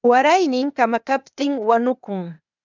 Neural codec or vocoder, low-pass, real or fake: codec, 16 kHz, 0.8 kbps, ZipCodec; 7.2 kHz; fake